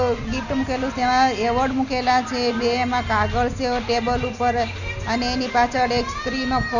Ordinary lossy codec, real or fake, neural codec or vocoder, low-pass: none; real; none; 7.2 kHz